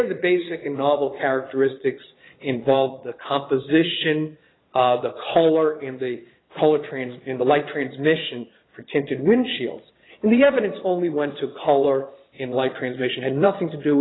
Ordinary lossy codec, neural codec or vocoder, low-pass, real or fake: AAC, 16 kbps; vocoder, 22.05 kHz, 80 mel bands, Vocos; 7.2 kHz; fake